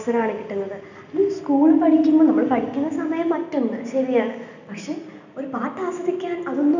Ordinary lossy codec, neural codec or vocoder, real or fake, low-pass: none; none; real; 7.2 kHz